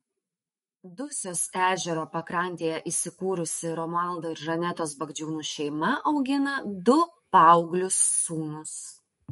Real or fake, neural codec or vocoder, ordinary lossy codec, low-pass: fake; autoencoder, 48 kHz, 128 numbers a frame, DAC-VAE, trained on Japanese speech; MP3, 48 kbps; 19.8 kHz